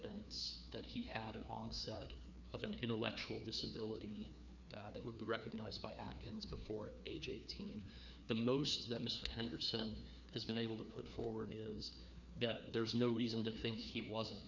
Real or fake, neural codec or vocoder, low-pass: fake; codec, 16 kHz, 2 kbps, FreqCodec, larger model; 7.2 kHz